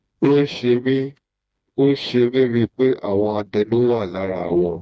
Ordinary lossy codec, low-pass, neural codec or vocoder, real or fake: none; none; codec, 16 kHz, 2 kbps, FreqCodec, smaller model; fake